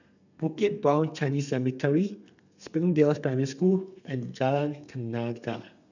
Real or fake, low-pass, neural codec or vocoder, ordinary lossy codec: fake; 7.2 kHz; codec, 32 kHz, 1.9 kbps, SNAC; none